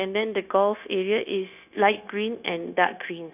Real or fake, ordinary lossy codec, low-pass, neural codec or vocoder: fake; none; 3.6 kHz; codec, 16 kHz, 0.9 kbps, LongCat-Audio-Codec